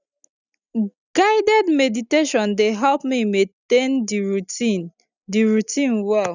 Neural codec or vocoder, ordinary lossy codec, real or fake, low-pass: none; none; real; 7.2 kHz